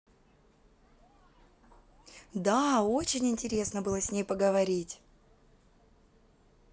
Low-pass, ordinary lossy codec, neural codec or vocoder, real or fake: none; none; none; real